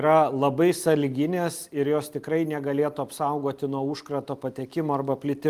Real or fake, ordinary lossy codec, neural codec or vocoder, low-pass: real; Opus, 32 kbps; none; 14.4 kHz